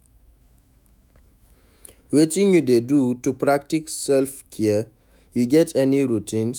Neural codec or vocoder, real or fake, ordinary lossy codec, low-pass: autoencoder, 48 kHz, 128 numbers a frame, DAC-VAE, trained on Japanese speech; fake; none; none